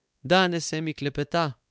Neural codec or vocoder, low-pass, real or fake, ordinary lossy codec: codec, 16 kHz, 4 kbps, X-Codec, WavLM features, trained on Multilingual LibriSpeech; none; fake; none